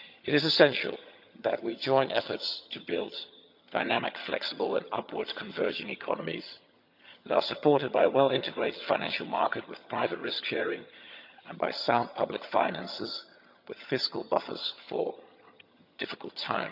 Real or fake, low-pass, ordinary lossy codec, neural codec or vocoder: fake; 5.4 kHz; none; vocoder, 22.05 kHz, 80 mel bands, HiFi-GAN